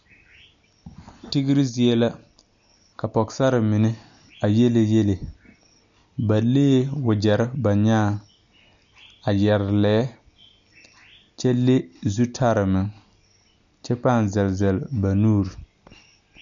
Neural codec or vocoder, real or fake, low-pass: none; real; 7.2 kHz